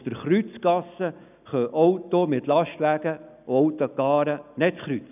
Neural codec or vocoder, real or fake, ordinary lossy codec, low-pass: none; real; none; 3.6 kHz